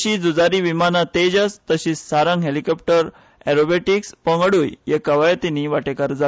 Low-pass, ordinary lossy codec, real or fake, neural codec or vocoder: none; none; real; none